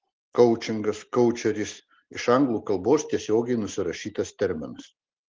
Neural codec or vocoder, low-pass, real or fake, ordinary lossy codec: none; 7.2 kHz; real; Opus, 16 kbps